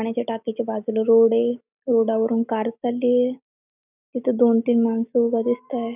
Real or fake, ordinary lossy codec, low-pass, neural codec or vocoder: real; none; 3.6 kHz; none